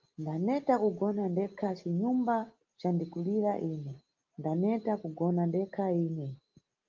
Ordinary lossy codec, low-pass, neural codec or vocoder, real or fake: Opus, 32 kbps; 7.2 kHz; none; real